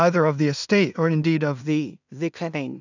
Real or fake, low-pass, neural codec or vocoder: fake; 7.2 kHz; codec, 16 kHz in and 24 kHz out, 0.4 kbps, LongCat-Audio-Codec, two codebook decoder